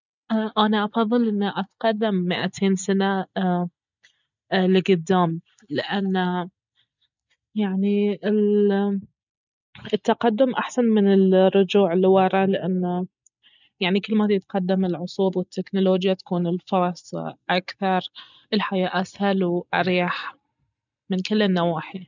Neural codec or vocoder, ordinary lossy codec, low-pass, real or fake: none; none; 7.2 kHz; real